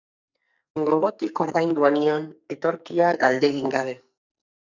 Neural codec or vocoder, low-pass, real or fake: codec, 44.1 kHz, 2.6 kbps, SNAC; 7.2 kHz; fake